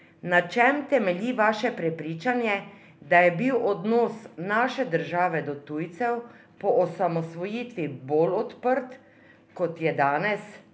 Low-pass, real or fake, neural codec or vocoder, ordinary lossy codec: none; real; none; none